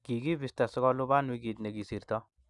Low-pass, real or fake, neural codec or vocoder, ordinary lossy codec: 10.8 kHz; real; none; none